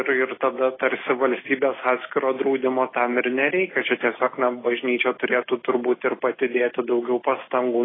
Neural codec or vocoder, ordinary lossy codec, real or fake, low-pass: none; AAC, 16 kbps; real; 7.2 kHz